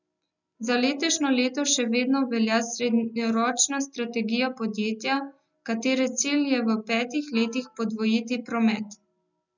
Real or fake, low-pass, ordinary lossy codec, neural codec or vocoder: real; 7.2 kHz; none; none